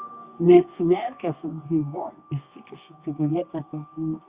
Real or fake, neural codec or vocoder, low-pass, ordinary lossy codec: fake; codec, 24 kHz, 0.9 kbps, WavTokenizer, medium music audio release; 3.6 kHz; Opus, 64 kbps